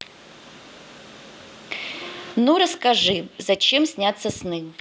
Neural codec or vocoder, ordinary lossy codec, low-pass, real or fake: none; none; none; real